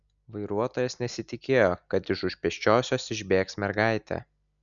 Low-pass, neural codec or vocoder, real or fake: 7.2 kHz; none; real